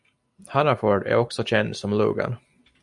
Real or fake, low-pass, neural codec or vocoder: real; 10.8 kHz; none